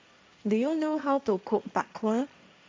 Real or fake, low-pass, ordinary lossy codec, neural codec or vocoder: fake; none; none; codec, 16 kHz, 1.1 kbps, Voila-Tokenizer